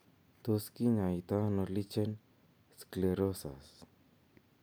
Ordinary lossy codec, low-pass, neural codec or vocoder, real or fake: none; none; none; real